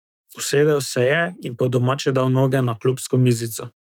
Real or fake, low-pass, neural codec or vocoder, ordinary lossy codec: fake; 19.8 kHz; codec, 44.1 kHz, 7.8 kbps, DAC; none